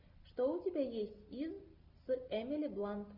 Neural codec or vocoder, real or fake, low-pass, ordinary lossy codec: none; real; 5.4 kHz; Opus, 64 kbps